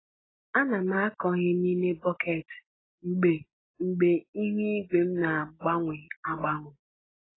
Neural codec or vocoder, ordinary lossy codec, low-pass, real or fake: none; AAC, 16 kbps; 7.2 kHz; real